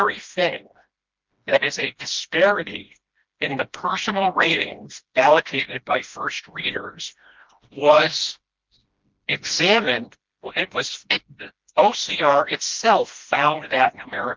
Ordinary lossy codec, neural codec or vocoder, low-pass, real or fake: Opus, 24 kbps; codec, 16 kHz, 1 kbps, FreqCodec, smaller model; 7.2 kHz; fake